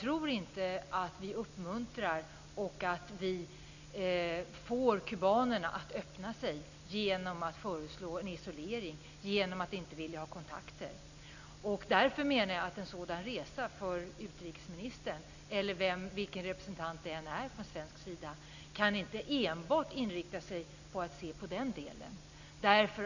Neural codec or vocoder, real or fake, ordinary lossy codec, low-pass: none; real; none; 7.2 kHz